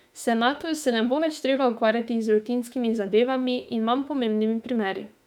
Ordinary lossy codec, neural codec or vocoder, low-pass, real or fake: none; autoencoder, 48 kHz, 32 numbers a frame, DAC-VAE, trained on Japanese speech; 19.8 kHz; fake